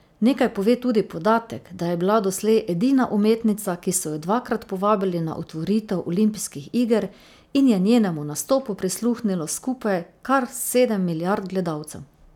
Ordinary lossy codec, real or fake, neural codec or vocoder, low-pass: none; real; none; 19.8 kHz